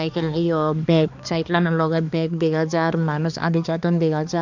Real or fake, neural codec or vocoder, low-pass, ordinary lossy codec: fake; codec, 16 kHz, 2 kbps, X-Codec, HuBERT features, trained on balanced general audio; 7.2 kHz; none